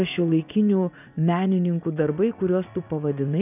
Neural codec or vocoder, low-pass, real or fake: vocoder, 44.1 kHz, 80 mel bands, Vocos; 3.6 kHz; fake